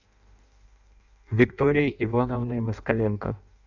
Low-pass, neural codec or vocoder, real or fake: 7.2 kHz; codec, 16 kHz in and 24 kHz out, 0.6 kbps, FireRedTTS-2 codec; fake